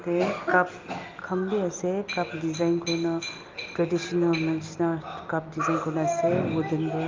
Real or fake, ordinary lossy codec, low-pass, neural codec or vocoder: real; Opus, 32 kbps; 7.2 kHz; none